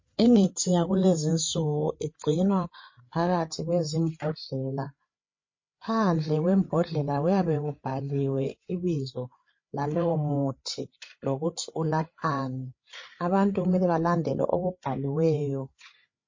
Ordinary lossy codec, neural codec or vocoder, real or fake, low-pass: MP3, 32 kbps; codec, 16 kHz, 8 kbps, FreqCodec, larger model; fake; 7.2 kHz